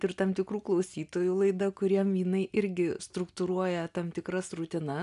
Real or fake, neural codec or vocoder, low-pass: real; none; 10.8 kHz